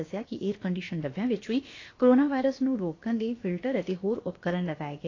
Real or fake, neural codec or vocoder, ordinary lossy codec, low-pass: fake; codec, 16 kHz, about 1 kbps, DyCAST, with the encoder's durations; AAC, 32 kbps; 7.2 kHz